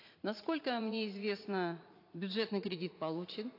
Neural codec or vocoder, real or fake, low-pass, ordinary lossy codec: vocoder, 44.1 kHz, 80 mel bands, Vocos; fake; 5.4 kHz; AAC, 32 kbps